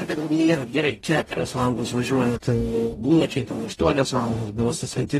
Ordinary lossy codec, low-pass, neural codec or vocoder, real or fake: AAC, 32 kbps; 19.8 kHz; codec, 44.1 kHz, 0.9 kbps, DAC; fake